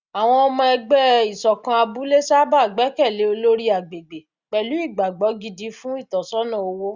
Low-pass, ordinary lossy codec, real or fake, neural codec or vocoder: 7.2 kHz; Opus, 64 kbps; real; none